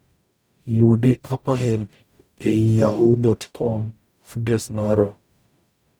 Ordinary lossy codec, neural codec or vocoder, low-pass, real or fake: none; codec, 44.1 kHz, 0.9 kbps, DAC; none; fake